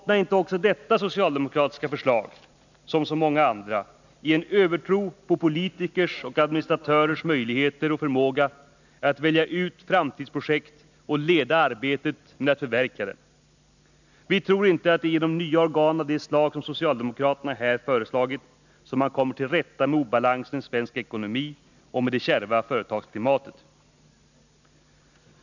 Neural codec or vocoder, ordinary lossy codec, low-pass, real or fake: none; none; 7.2 kHz; real